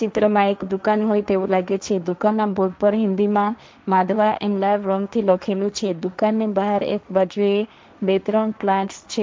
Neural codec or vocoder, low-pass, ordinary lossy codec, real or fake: codec, 16 kHz, 1.1 kbps, Voila-Tokenizer; none; none; fake